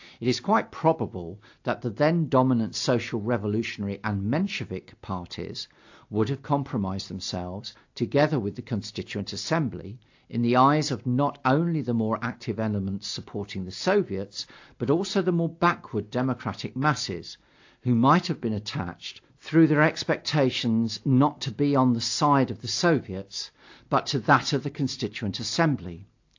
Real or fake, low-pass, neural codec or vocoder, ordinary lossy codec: real; 7.2 kHz; none; AAC, 48 kbps